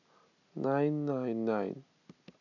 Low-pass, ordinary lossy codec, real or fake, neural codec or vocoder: 7.2 kHz; none; real; none